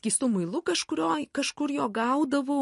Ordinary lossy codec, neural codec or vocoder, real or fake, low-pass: MP3, 48 kbps; none; real; 14.4 kHz